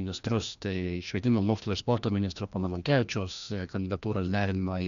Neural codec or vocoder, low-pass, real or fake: codec, 16 kHz, 1 kbps, FreqCodec, larger model; 7.2 kHz; fake